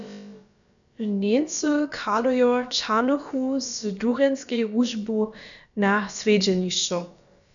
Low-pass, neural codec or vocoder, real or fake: 7.2 kHz; codec, 16 kHz, about 1 kbps, DyCAST, with the encoder's durations; fake